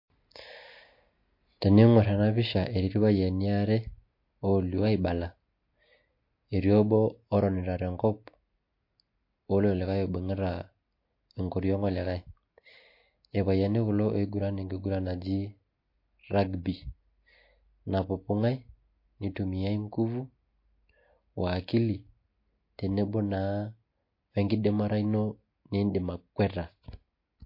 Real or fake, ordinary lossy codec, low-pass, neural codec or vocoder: real; MP3, 32 kbps; 5.4 kHz; none